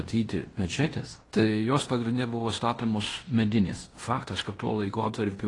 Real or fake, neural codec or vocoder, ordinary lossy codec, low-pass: fake; codec, 16 kHz in and 24 kHz out, 0.9 kbps, LongCat-Audio-Codec, fine tuned four codebook decoder; AAC, 32 kbps; 10.8 kHz